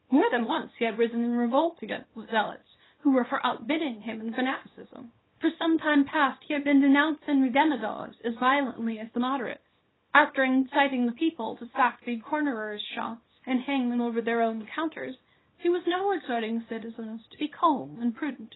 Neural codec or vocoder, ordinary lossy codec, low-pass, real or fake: codec, 24 kHz, 0.9 kbps, WavTokenizer, small release; AAC, 16 kbps; 7.2 kHz; fake